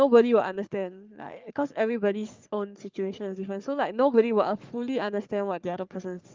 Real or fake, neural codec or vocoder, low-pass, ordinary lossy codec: fake; codec, 44.1 kHz, 3.4 kbps, Pupu-Codec; 7.2 kHz; Opus, 32 kbps